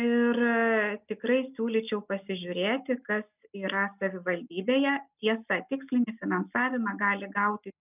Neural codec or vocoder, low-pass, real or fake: none; 3.6 kHz; real